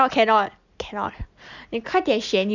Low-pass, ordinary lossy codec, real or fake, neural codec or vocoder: 7.2 kHz; none; real; none